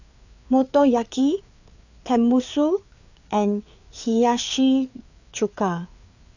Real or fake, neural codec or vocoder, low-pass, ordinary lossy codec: fake; codec, 16 kHz, 4 kbps, FreqCodec, larger model; 7.2 kHz; none